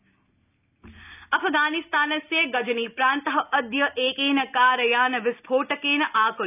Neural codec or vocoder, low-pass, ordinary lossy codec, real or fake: none; 3.6 kHz; none; real